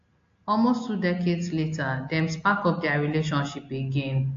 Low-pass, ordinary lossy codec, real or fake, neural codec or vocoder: 7.2 kHz; AAC, 48 kbps; real; none